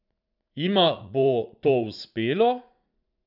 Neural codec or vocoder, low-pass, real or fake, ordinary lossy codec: vocoder, 44.1 kHz, 80 mel bands, Vocos; 5.4 kHz; fake; none